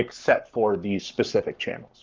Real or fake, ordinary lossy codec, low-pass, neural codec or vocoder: fake; Opus, 24 kbps; 7.2 kHz; codec, 44.1 kHz, 7.8 kbps, Pupu-Codec